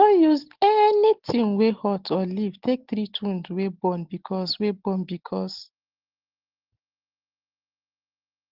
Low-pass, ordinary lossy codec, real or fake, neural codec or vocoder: 5.4 kHz; Opus, 16 kbps; real; none